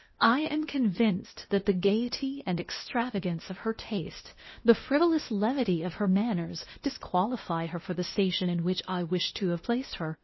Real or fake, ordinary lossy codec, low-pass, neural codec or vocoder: fake; MP3, 24 kbps; 7.2 kHz; codec, 16 kHz, 0.8 kbps, ZipCodec